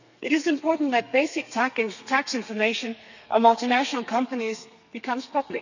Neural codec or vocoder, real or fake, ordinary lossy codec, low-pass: codec, 32 kHz, 1.9 kbps, SNAC; fake; none; 7.2 kHz